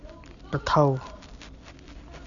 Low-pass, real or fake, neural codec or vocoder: 7.2 kHz; real; none